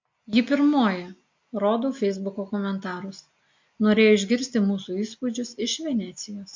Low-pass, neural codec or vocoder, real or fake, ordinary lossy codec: 7.2 kHz; none; real; MP3, 48 kbps